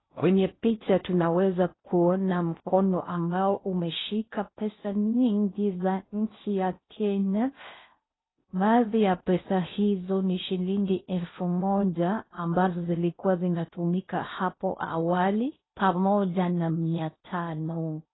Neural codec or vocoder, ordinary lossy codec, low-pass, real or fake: codec, 16 kHz in and 24 kHz out, 0.6 kbps, FocalCodec, streaming, 4096 codes; AAC, 16 kbps; 7.2 kHz; fake